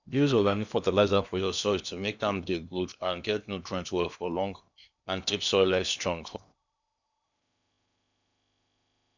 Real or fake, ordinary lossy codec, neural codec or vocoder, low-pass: fake; none; codec, 16 kHz in and 24 kHz out, 0.8 kbps, FocalCodec, streaming, 65536 codes; 7.2 kHz